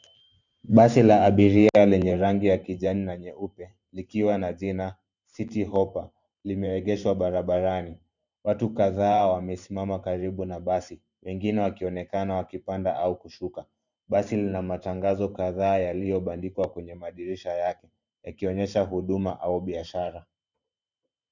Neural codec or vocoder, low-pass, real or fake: vocoder, 44.1 kHz, 128 mel bands every 512 samples, BigVGAN v2; 7.2 kHz; fake